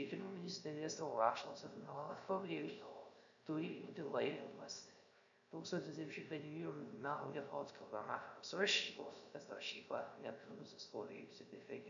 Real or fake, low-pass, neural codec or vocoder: fake; 7.2 kHz; codec, 16 kHz, 0.3 kbps, FocalCodec